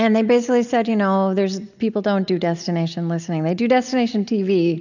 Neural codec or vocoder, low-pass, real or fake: none; 7.2 kHz; real